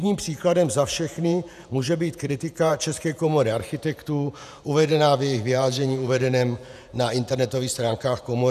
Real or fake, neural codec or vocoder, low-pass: fake; vocoder, 48 kHz, 128 mel bands, Vocos; 14.4 kHz